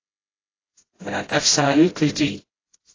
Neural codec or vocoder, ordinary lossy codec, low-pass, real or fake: codec, 16 kHz, 0.5 kbps, FreqCodec, smaller model; AAC, 32 kbps; 7.2 kHz; fake